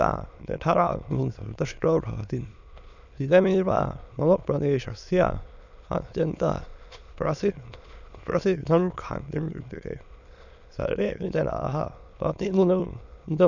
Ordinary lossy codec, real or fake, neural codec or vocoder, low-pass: none; fake; autoencoder, 22.05 kHz, a latent of 192 numbers a frame, VITS, trained on many speakers; 7.2 kHz